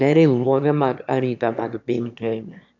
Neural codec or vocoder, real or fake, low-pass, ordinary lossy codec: autoencoder, 22.05 kHz, a latent of 192 numbers a frame, VITS, trained on one speaker; fake; 7.2 kHz; none